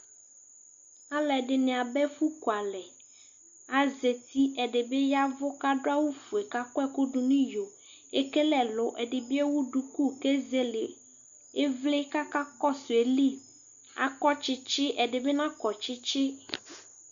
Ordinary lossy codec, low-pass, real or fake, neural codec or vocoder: Opus, 64 kbps; 7.2 kHz; real; none